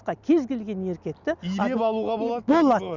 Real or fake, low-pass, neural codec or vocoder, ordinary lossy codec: real; 7.2 kHz; none; none